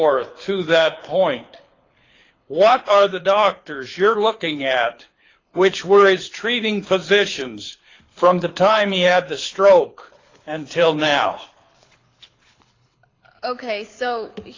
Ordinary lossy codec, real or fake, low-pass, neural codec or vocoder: AAC, 32 kbps; fake; 7.2 kHz; codec, 24 kHz, 6 kbps, HILCodec